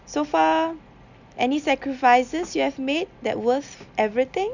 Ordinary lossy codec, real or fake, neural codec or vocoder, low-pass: none; real; none; 7.2 kHz